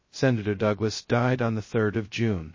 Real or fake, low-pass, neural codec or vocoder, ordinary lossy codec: fake; 7.2 kHz; codec, 16 kHz, 0.2 kbps, FocalCodec; MP3, 32 kbps